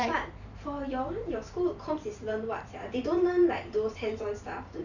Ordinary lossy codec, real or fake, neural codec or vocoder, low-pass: none; fake; vocoder, 44.1 kHz, 128 mel bands every 512 samples, BigVGAN v2; 7.2 kHz